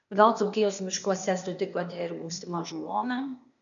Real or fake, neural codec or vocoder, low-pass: fake; codec, 16 kHz, 0.8 kbps, ZipCodec; 7.2 kHz